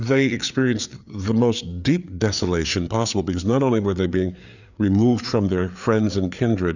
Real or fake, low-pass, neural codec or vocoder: fake; 7.2 kHz; codec, 16 kHz, 4 kbps, FreqCodec, larger model